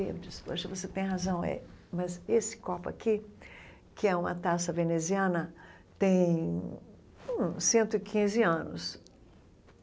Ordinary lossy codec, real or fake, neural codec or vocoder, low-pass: none; real; none; none